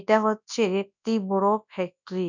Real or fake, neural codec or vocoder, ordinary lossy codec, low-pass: fake; codec, 24 kHz, 0.9 kbps, WavTokenizer, large speech release; none; 7.2 kHz